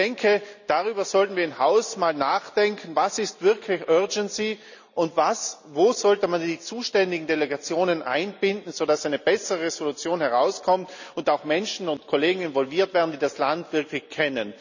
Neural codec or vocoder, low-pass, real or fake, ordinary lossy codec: none; 7.2 kHz; real; none